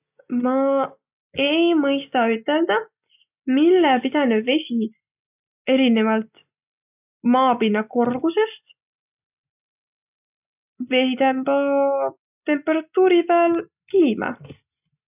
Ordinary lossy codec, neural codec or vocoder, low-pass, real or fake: none; none; 3.6 kHz; real